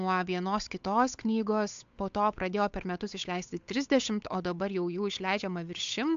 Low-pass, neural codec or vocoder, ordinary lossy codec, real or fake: 7.2 kHz; codec, 16 kHz, 8 kbps, FunCodec, trained on Chinese and English, 25 frames a second; AAC, 64 kbps; fake